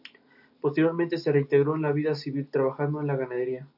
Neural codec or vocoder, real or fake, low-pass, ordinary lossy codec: none; real; 5.4 kHz; AAC, 48 kbps